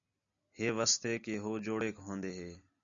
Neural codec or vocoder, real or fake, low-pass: none; real; 7.2 kHz